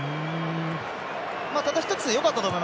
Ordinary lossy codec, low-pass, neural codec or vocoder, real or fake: none; none; none; real